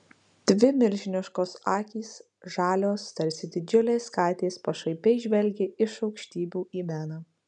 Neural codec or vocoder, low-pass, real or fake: none; 9.9 kHz; real